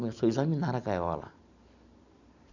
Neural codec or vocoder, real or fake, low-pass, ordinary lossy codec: none; real; 7.2 kHz; none